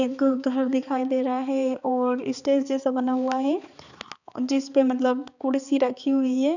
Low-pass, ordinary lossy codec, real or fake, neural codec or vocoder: 7.2 kHz; none; fake; codec, 16 kHz, 4 kbps, X-Codec, HuBERT features, trained on balanced general audio